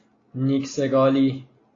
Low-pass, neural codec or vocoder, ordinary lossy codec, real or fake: 7.2 kHz; none; AAC, 32 kbps; real